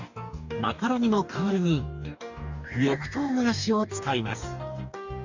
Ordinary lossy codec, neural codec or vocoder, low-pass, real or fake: none; codec, 44.1 kHz, 2.6 kbps, DAC; 7.2 kHz; fake